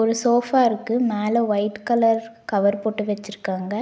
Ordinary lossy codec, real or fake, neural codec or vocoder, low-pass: none; real; none; none